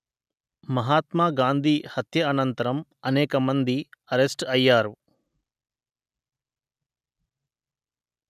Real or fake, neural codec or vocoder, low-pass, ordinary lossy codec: real; none; 14.4 kHz; none